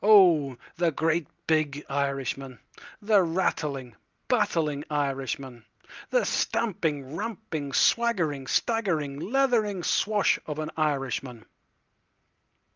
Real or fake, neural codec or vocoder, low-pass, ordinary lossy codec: real; none; 7.2 kHz; Opus, 24 kbps